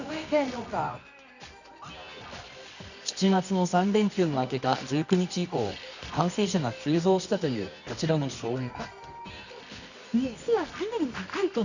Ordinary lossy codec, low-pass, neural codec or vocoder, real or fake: none; 7.2 kHz; codec, 24 kHz, 0.9 kbps, WavTokenizer, medium music audio release; fake